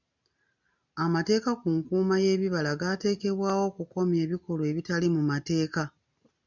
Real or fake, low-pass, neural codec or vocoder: real; 7.2 kHz; none